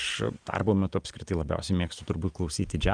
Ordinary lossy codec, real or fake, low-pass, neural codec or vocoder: Opus, 32 kbps; real; 9.9 kHz; none